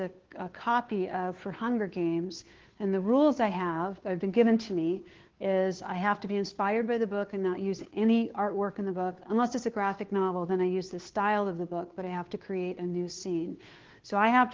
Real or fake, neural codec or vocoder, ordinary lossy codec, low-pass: fake; codec, 16 kHz, 2 kbps, FunCodec, trained on Chinese and English, 25 frames a second; Opus, 16 kbps; 7.2 kHz